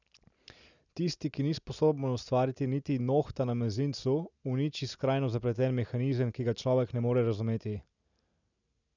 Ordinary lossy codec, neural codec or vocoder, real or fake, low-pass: none; none; real; 7.2 kHz